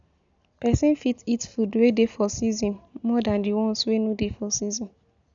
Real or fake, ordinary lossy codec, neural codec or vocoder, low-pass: real; none; none; 7.2 kHz